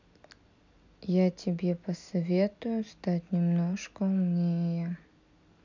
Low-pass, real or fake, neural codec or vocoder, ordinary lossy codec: 7.2 kHz; real; none; none